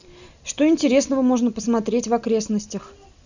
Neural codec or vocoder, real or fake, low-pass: none; real; 7.2 kHz